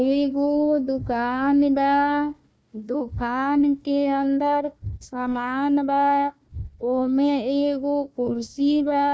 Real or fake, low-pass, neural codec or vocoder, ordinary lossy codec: fake; none; codec, 16 kHz, 1 kbps, FunCodec, trained on Chinese and English, 50 frames a second; none